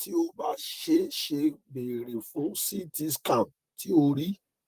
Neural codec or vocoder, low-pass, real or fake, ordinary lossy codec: vocoder, 44.1 kHz, 128 mel bands, Pupu-Vocoder; 14.4 kHz; fake; Opus, 24 kbps